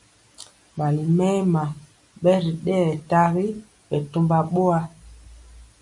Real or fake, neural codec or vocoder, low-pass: real; none; 10.8 kHz